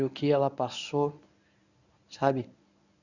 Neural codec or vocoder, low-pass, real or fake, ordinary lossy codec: codec, 24 kHz, 0.9 kbps, WavTokenizer, medium speech release version 1; 7.2 kHz; fake; none